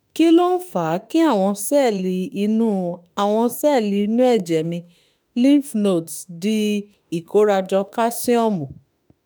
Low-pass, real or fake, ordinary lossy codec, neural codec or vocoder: none; fake; none; autoencoder, 48 kHz, 32 numbers a frame, DAC-VAE, trained on Japanese speech